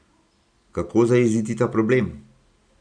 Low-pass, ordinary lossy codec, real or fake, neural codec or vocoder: 9.9 kHz; none; real; none